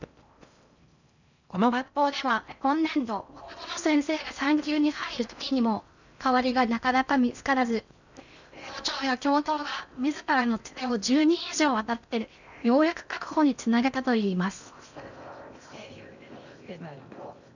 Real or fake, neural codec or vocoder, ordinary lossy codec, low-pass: fake; codec, 16 kHz in and 24 kHz out, 0.6 kbps, FocalCodec, streaming, 4096 codes; none; 7.2 kHz